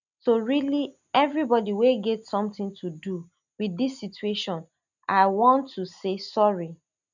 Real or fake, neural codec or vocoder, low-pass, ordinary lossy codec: real; none; 7.2 kHz; none